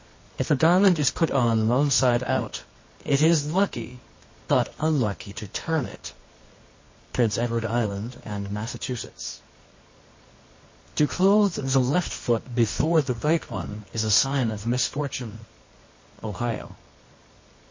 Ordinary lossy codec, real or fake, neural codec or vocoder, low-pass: MP3, 32 kbps; fake; codec, 24 kHz, 0.9 kbps, WavTokenizer, medium music audio release; 7.2 kHz